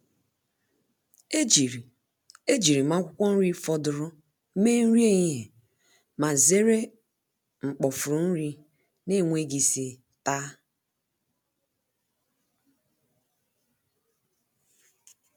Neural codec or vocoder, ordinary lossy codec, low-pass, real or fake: none; none; none; real